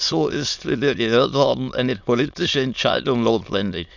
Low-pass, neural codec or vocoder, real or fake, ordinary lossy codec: 7.2 kHz; autoencoder, 22.05 kHz, a latent of 192 numbers a frame, VITS, trained on many speakers; fake; none